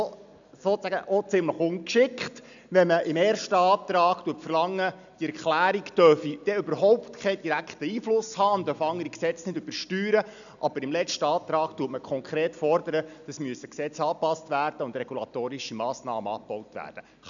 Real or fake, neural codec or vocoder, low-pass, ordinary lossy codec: real; none; 7.2 kHz; none